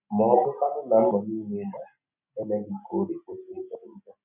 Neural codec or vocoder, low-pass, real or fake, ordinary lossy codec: none; 3.6 kHz; real; none